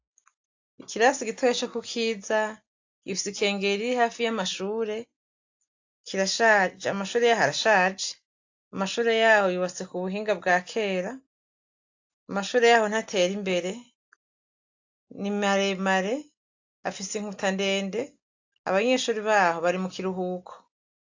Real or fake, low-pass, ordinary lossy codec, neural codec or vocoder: real; 7.2 kHz; AAC, 48 kbps; none